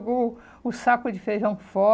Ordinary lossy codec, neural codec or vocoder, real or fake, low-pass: none; none; real; none